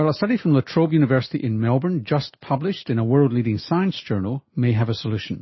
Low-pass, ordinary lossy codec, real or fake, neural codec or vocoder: 7.2 kHz; MP3, 24 kbps; real; none